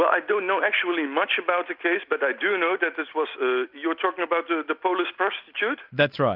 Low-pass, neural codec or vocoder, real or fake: 5.4 kHz; none; real